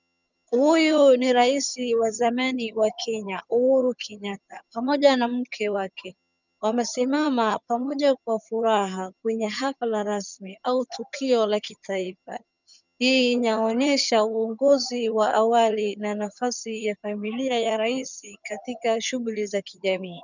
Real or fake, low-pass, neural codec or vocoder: fake; 7.2 kHz; vocoder, 22.05 kHz, 80 mel bands, HiFi-GAN